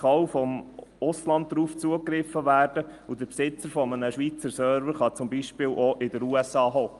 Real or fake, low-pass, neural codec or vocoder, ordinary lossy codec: real; 10.8 kHz; none; Opus, 32 kbps